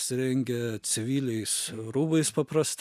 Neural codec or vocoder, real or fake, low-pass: none; real; 14.4 kHz